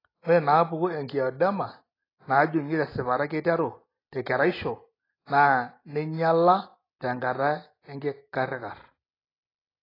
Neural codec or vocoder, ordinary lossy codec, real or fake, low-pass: none; AAC, 24 kbps; real; 5.4 kHz